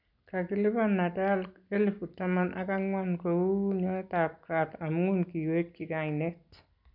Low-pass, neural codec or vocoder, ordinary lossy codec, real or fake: 5.4 kHz; none; none; real